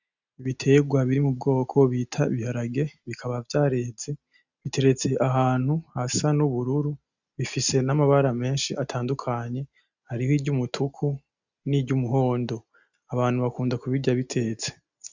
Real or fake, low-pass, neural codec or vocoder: real; 7.2 kHz; none